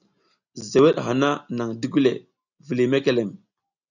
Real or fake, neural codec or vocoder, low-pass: real; none; 7.2 kHz